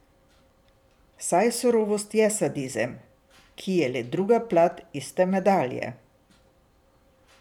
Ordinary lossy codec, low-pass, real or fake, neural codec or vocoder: none; 19.8 kHz; fake; vocoder, 44.1 kHz, 128 mel bands every 512 samples, BigVGAN v2